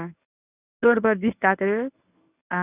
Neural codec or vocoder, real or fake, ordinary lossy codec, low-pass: codec, 24 kHz, 0.9 kbps, WavTokenizer, medium speech release version 1; fake; none; 3.6 kHz